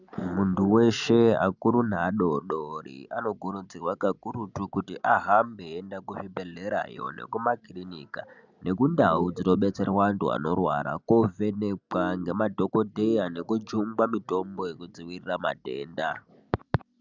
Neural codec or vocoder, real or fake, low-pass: none; real; 7.2 kHz